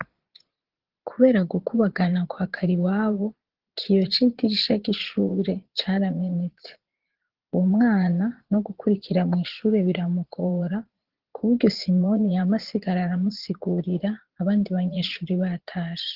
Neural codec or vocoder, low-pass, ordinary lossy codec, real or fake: vocoder, 22.05 kHz, 80 mel bands, Vocos; 5.4 kHz; Opus, 16 kbps; fake